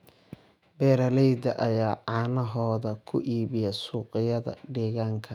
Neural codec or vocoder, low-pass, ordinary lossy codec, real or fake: autoencoder, 48 kHz, 128 numbers a frame, DAC-VAE, trained on Japanese speech; 19.8 kHz; none; fake